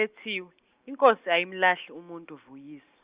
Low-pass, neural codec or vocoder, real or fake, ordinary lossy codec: 3.6 kHz; none; real; Opus, 64 kbps